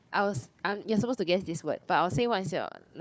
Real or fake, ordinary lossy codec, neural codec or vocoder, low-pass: fake; none; codec, 16 kHz, 4 kbps, FunCodec, trained on Chinese and English, 50 frames a second; none